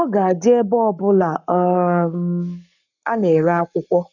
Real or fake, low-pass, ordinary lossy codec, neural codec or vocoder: fake; 7.2 kHz; none; codec, 44.1 kHz, 7.8 kbps, Pupu-Codec